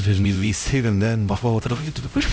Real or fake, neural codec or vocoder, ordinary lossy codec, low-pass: fake; codec, 16 kHz, 0.5 kbps, X-Codec, HuBERT features, trained on LibriSpeech; none; none